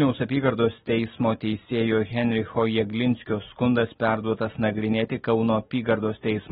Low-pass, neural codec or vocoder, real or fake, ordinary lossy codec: 19.8 kHz; none; real; AAC, 16 kbps